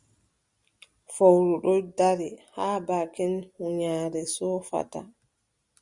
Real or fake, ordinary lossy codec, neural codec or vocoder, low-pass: real; Opus, 64 kbps; none; 10.8 kHz